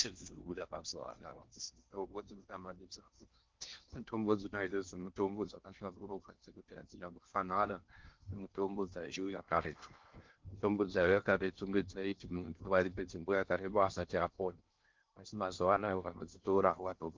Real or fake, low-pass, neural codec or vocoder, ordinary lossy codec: fake; 7.2 kHz; codec, 16 kHz in and 24 kHz out, 0.6 kbps, FocalCodec, streaming, 4096 codes; Opus, 24 kbps